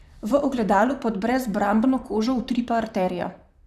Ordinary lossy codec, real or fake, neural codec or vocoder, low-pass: none; fake; vocoder, 44.1 kHz, 128 mel bands, Pupu-Vocoder; 14.4 kHz